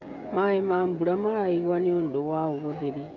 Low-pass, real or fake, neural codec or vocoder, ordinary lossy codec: 7.2 kHz; fake; codec, 16 kHz, 16 kbps, FreqCodec, smaller model; none